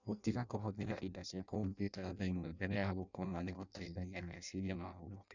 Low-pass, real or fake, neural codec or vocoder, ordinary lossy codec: 7.2 kHz; fake; codec, 16 kHz in and 24 kHz out, 0.6 kbps, FireRedTTS-2 codec; none